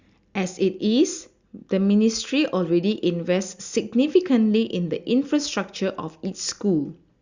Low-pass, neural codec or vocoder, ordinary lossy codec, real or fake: 7.2 kHz; none; Opus, 64 kbps; real